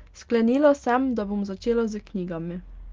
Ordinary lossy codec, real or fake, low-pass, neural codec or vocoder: Opus, 24 kbps; real; 7.2 kHz; none